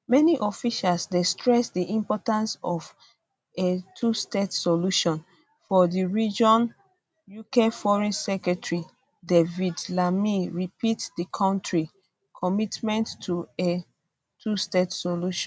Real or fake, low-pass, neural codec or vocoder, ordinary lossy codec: real; none; none; none